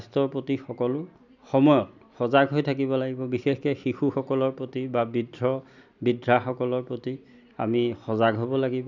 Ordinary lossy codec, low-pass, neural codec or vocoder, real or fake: none; 7.2 kHz; none; real